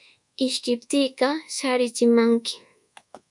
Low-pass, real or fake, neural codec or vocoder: 10.8 kHz; fake; codec, 24 kHz, 1.2 kbps, DualCodec